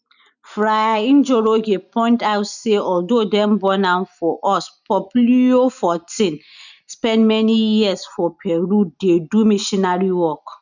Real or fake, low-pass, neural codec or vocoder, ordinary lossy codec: real; 7.2 kHz; none; none